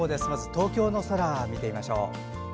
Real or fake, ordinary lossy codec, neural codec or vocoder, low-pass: real; none; none; none